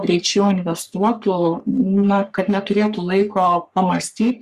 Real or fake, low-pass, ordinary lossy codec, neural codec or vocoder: fake; 14.4 kHz; Opus, 64 kbps; codec, 44.1 kHz, 3.4 kbps, Pupu-Codec